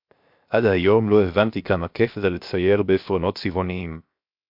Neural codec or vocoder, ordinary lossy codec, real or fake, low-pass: codec, 16 kHz, 0.7 kbps, FocalCodec; MP3, 48 kbps; fake; 5.4 kHz